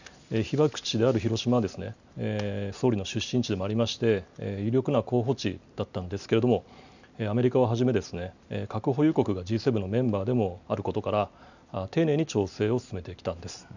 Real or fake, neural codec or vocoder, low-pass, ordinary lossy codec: real; none; 7.2 kHz; none